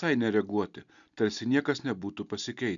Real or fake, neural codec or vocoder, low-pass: real; none; 7.2 kHz